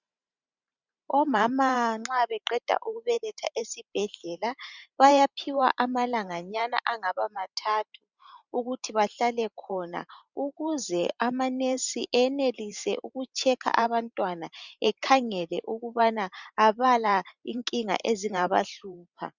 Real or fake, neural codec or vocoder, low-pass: fake; vocoder, 44.1 kHz, 128 mel bands every 512 samples, BigVGAN v2; 7.2 kHz